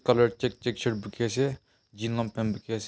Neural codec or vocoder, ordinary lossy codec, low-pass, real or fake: none; none; none; real